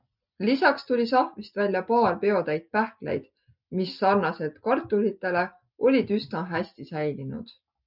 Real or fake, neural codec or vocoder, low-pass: real; none; 5.4 kHz